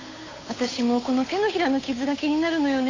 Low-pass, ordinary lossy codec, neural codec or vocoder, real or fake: 7.2 kHz; AAC, 32 kbps; codec, 16 kHz, 8 kbps, FunCodec, trained on Chinese and English, 25 frames a second; fake